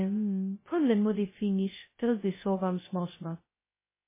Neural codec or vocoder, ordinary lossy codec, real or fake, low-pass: codec, 16 kHz, 0.2 kbps, FocalCodec; MP3, 16 kbps; fake; 3.6 kHz